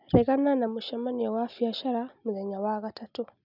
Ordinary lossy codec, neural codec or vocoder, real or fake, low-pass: none; none; real; 5.4 kHz